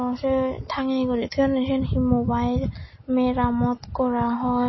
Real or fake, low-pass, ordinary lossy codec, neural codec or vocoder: real; 7.2 kHz; MP3, 24 kbps; none